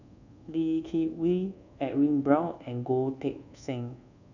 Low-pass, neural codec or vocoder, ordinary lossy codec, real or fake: 7.2 kHz; codec, 24 kHz, 1.2 kbps, DualCodec; none; fake